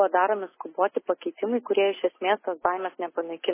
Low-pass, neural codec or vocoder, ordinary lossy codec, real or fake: 3.6 kHz; none; MP3, 16 kbps; real